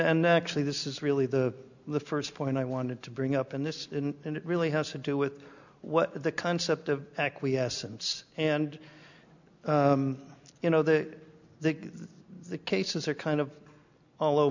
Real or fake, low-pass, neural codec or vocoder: real; 7.2 kHz; none